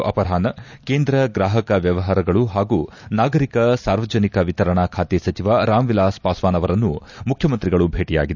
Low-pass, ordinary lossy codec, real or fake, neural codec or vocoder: 7.2 kHz; none; real; none